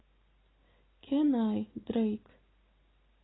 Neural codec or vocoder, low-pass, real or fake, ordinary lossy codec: none; 7.2 kHz; real; AAC, 16 kbps